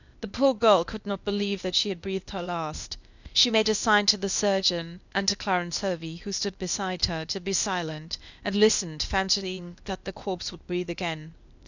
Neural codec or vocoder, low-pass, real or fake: codec, 16 kHz, 0.8 kbps, ZipCodec; 7.2 kHz; fake